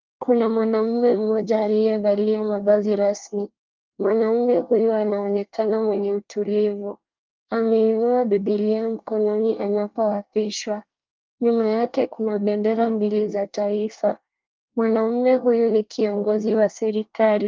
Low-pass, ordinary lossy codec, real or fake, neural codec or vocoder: 7.2 kHz; Opus, 24 kbps; fake; codec, 24 kHz, 1 kbps, SNAC